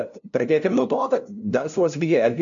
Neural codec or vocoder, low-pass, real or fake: codec, 16 kHz, 0.5 kbps, FunCodec, trained on LibriTTS, 25 frames a second; 7.2 kHz; fake